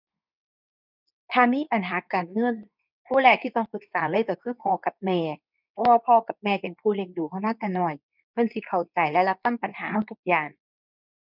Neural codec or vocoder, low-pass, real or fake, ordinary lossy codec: codec, 24 kHz, 0.9 kbps, WavTokenizer, medium speech release version 2; 5.4 kHz; fake; none